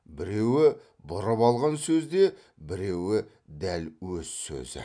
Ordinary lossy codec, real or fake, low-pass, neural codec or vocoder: none; real; 9.9 kHz; none